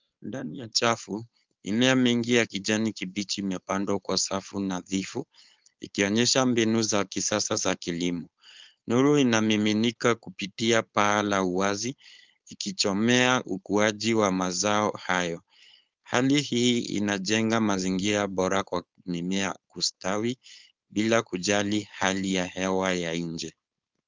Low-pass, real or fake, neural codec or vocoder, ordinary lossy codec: 7.2 kHz; fake; codec, 16 kHz, 4.8 kbps, FACodec; Opus, 24 kbps